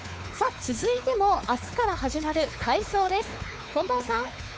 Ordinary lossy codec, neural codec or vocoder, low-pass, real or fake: none; codec, 16 kHz, 4 kbps, X-Codec, WavLM features, trained on Multilingual LibriSpeech; none; fake